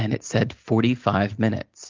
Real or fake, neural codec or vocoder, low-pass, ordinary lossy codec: real; none; 7.2 kHz; Opus, 32 kbps